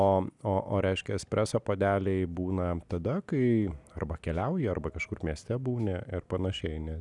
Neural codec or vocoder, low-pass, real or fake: none; 10.8 kHz; real